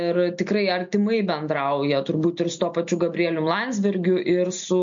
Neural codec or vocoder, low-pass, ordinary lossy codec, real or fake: none; 7.2 kHz; MP3, 48 kbps; real